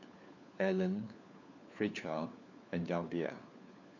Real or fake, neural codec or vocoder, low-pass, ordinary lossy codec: fake; codec, 16 kHz, 4 kbps, FunCodec, trained on LibriTTS, 50 frames a second; 7.2 kHz; none